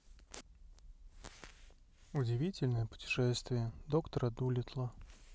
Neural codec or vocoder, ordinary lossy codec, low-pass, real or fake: none; none; none; real